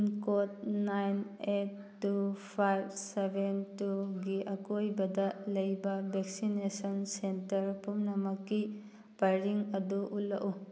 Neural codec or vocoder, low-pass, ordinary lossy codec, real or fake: none; none; none; real